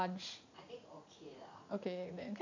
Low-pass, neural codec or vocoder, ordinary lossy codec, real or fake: 7.2 kHz; vocoder, 44.1 kHz, 80 mel bands, Vocos; AAC, 48 kbps; fake